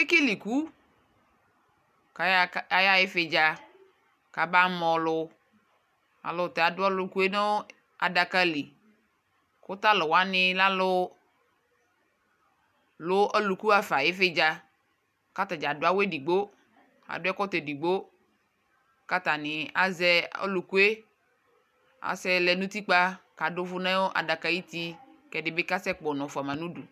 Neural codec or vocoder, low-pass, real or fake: none; 14.4 kHz; real